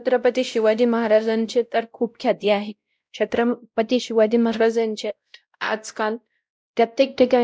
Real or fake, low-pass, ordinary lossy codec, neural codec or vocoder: fake; none; none; codec, 16 kHz, 0.5 kbps, X-Codec, WavLM features, trained on Multilingual LibriSpeech